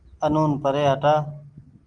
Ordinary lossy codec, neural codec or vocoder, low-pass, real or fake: Opus, 24 kbps; none; 9.9 kHz; real